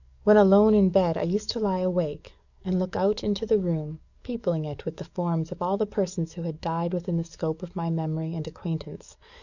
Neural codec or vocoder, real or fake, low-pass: codec, 44.1 kHz, 7.8 kbps, DAC; fake; 7.2 kHz